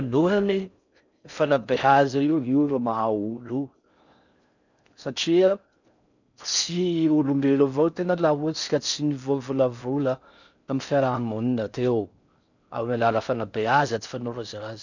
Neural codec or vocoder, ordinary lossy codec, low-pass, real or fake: codec, 16 kHz in and 24 kHz out, 0.6 kbps, FocalCodec, streaming, 4096 codes; none; 7.2 kHz; fake